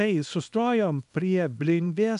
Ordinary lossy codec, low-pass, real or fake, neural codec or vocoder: AAC, 96 kbps; 10.8 kHz; fake; codec, 24 kHz, 0.9 kbps, WavTokenizer, small release